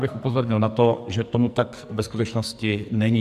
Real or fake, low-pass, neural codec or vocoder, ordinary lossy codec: fake; 14.4 kHz; codec, 44.1 kHz, 2.6 kbps, SNAC; MP3, 96 kbps